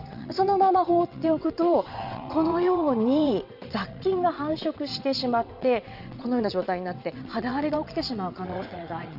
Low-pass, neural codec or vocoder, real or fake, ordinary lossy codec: 5.4 kHz; vocoder, 22.05 kHz, 80 mel bands, WaveNeXt; fake; none